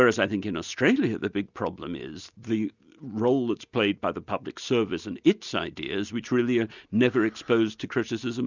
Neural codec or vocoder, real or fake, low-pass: none; real; 7.2 kHz